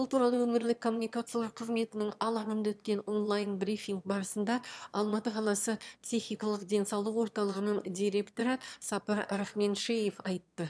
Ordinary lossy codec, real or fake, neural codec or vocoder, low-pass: none; fake; autoencoder, 22.05 kHz, a latent of 192 numbers a frame, VITS, trained on one speaker; none